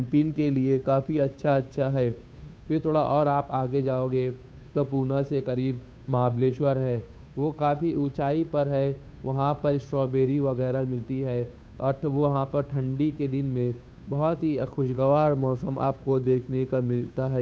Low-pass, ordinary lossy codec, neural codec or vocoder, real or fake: none; none; codec, 16 kHz, 2 kbps, FunCodec, trained on Chinese and English, 25 frames a second; fake